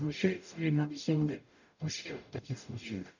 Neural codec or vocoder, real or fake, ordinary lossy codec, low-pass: codec, 44.1 kHz, 0.9 kbps, DAC; fake; none; 7.2 kHz